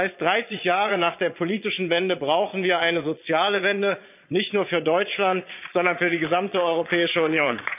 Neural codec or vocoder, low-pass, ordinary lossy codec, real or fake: vocoder, 22.05 kHz, 80 mel bands, Vocos; 3.6 kHz; none; fake